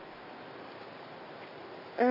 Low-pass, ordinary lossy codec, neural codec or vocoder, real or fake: 5.4 kHz; none; none; real